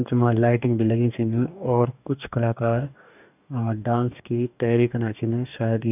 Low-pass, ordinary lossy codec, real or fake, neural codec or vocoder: 3.6 kHz; none; fake; codec, 44.1 kHz, 2.6 kbps, DAC